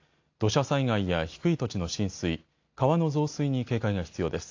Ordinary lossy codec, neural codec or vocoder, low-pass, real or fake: AAC, 48 kbps; none; 7.2 kHz; real